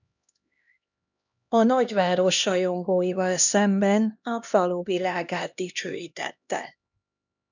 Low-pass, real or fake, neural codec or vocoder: 7.2 kHz; fake; codec, 16 kHz, 1 kbps, X-Codec, HuBERT features, trained on LibriSpeech